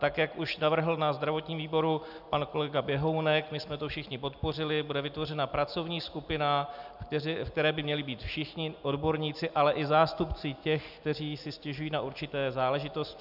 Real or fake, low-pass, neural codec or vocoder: real; 5.4 kHz; none